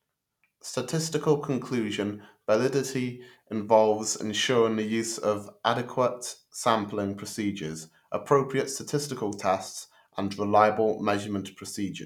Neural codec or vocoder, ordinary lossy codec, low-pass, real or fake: none; none; 19.8 kHz; real